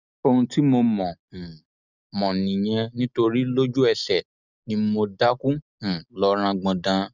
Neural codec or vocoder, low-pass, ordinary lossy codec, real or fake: none; 7.2 kHz; none; real